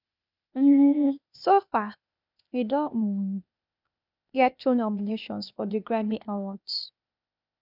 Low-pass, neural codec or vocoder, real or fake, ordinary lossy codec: 5.4 kHz; codec, 16 kHz, 0.8 kbps, ZipCodec; fake; none